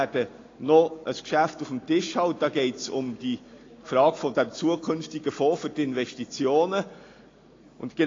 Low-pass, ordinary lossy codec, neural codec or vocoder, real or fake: 7.2 kHz; AAC, 32 kbps; none; real